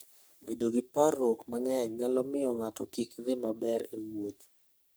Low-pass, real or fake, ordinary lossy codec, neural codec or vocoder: none; fake; none; codec, 44.1 kHz, 3.4 kbps, Pupu-Codec